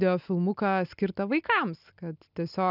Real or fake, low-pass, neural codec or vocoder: real; 5.4 kHz; none